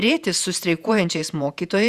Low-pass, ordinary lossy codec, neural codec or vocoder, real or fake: 14.4 kHz; Opus, 64 kbps; vocoder, 48 kHz, 128 mel bands, Vocos; fake